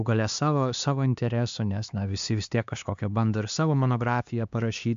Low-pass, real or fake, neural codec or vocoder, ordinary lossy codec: 7.2 kHz; fake; codec, 16 kHz, 2 kbps, X-Codec, HuBERT features, trained on LibriSpeech; MP3, 64 kbps